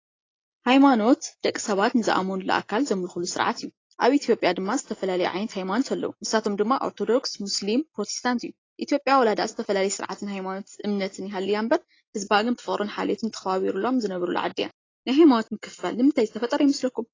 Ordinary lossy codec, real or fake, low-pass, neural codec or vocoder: AAC, 32 kbps; real; 7.2 kHz; none